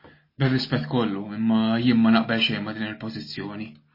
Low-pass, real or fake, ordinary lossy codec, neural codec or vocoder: 5.4 kHz; real; MP3, 24 kbps; none